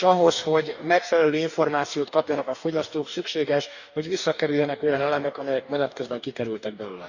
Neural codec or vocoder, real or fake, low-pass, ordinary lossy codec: codec, 44.1 kHz, 2.6 kbps, DAC; fake; 7.2 kHz; none